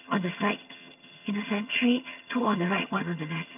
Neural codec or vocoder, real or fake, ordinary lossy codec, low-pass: vocoder, 22.05 kHz, 80 mel bands, HiFi-GAN; fake; none; 3.6 kHz